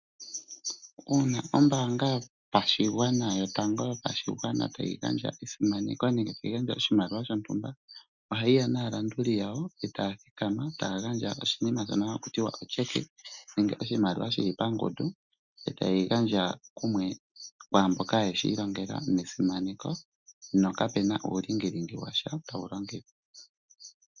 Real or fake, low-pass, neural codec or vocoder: real; 7.2 kHz; none